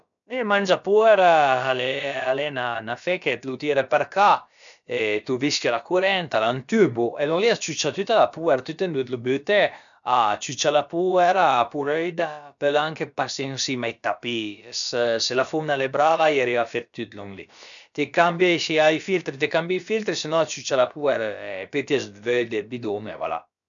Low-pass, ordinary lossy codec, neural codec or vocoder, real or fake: 7.2 kHz; MP3, 96 kbps; codec, 16 kHz, about 1 kbps, DyCAST, with the encoder's durations; fake